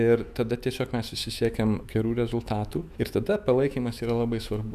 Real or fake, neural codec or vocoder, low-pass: fake; autoencoder, 48 kHz, 128 numbers a frame, DAC-VAE, trained on Japanese speech; 14.4 kHz